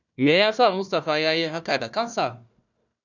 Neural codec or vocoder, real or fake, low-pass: codec, 16 kHz, 1 kbps, FunCodec, trained on Chinese and English, 50 frames a second; fake; 7.2 kHz